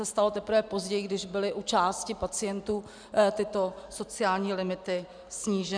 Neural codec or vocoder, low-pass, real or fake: none; 9.9 kHz; real